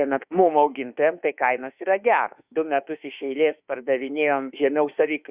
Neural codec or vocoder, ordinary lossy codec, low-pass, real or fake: autoencoder, 48 kHz, 32 numbers a frame, DAC-VAE, trained on Japanese speech; Opus, 64 kbps; 3.6 kHz; fake